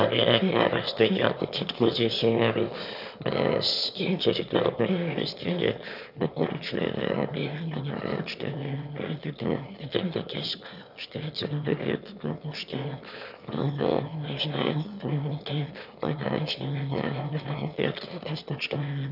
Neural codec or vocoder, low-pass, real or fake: autoencoder, 22.05 kHz, a latent of 192 numbers a frame, VITS, trained on one speaker; 5.4 kHz; fake